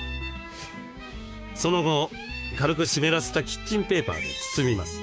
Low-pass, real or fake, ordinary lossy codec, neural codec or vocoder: none; fake; none; codec, 16 kHz, 6 kbps, DAC